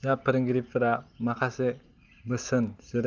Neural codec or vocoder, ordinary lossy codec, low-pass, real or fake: none; Opus, 24 kbps; 7.2 kHz; real